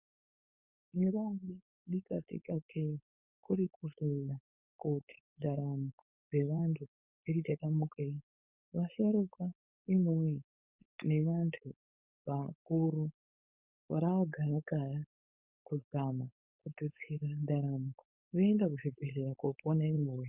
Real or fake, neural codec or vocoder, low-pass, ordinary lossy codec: fake; codec, 16 kHz, 4.8 kbps, FACodec; 3.6 kHz; Opus, 64 kbps